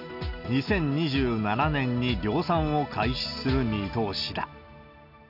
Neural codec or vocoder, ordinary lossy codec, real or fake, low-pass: none; none; real; 5.4 kHz